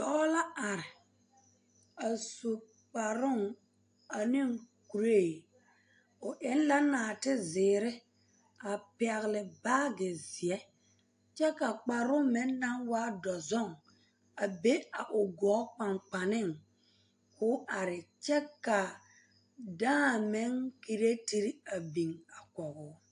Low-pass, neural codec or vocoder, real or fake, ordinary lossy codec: 9.9 kHz; none; real; AAC, 64 kbps